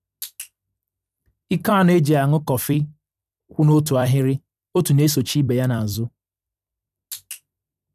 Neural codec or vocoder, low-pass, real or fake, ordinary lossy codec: vocoder, 44.1 kHz, 128 mel bands every 256 samples, BigVGAN v2; 14.4 kHz; fake; none